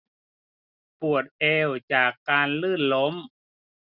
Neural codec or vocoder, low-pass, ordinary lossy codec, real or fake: none; 5.4 kHz; none; real